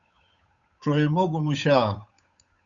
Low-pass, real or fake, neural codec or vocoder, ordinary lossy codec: 7.2 kHz; fake; codec, 16 kHz, 8 kbps, FunCodec, trained on Chinese and English, 25 frames a second; Opus, 64 kbps